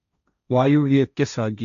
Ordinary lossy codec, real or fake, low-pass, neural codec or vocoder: none; fake; 7.2 kHz; codec, 16 kHz, 1.1 kbps, Voila-Tokenizer